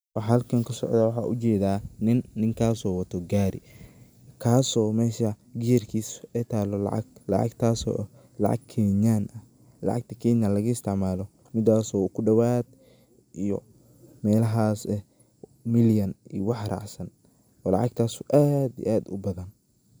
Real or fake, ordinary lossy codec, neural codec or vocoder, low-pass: real; none; none; none